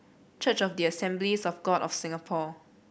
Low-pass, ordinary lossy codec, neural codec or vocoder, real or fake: none; none; none; real